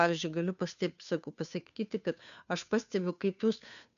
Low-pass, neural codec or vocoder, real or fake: 7.2 kHz; codec, 16 kHz, 2 kbps, FunCodec, trained on Chinese and English, 25 frames a second; fake